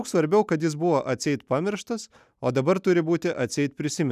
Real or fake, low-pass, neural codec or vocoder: real; 14.4 kHz; none